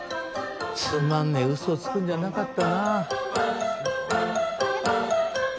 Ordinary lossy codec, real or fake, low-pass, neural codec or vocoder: none; real; none; none